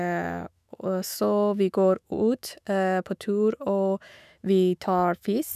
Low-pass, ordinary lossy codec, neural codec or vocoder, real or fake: 14.4 kHz; none; autoencoder, 48 kHz, 128 numbers a frame, DAC-VAE, trained on Japanese speech; fake